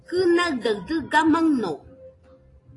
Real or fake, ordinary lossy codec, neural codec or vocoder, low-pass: real; AAC, 32 kbps; none; 10.8 kHz